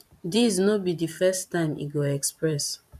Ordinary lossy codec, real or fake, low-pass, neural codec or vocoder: none; fake; 14.4 kHz; vocoder, 44.1 kHz, 128 mel bands every 512 samples, BigVGAN v2